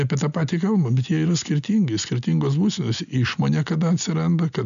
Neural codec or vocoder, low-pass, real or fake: none; 7.2 kHz; real